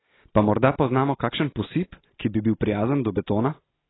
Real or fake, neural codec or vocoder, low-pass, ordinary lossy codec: real; none; 7.2 kHz; AAC, 16 kbps